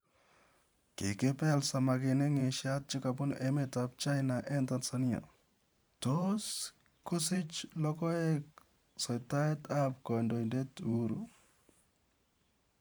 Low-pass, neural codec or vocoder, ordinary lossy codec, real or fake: none; vocoder, 44.1 kHz, 128 mel bands every 512 samples, BigVGAN v2; none; fake